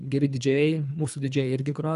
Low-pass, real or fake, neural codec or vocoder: 10.8 kHz; fake; codec, 24 kHz, 3 kbps, HILCodec